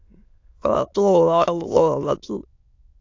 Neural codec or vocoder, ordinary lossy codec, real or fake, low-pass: autoencoder, 22.05 kHz, a latent of 192 numbers a frame, VITS, trained on many speakers; AAC, 48 kbps; fake; 7.2 kHz